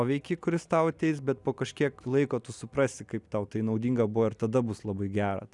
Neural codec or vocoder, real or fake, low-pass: none; real; 10.8 kHz